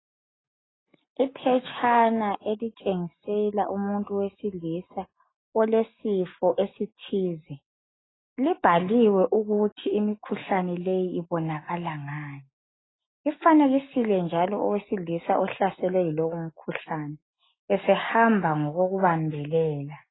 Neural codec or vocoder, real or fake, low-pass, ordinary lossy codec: none; real; 7.2 kHz; AAC, 16 kbps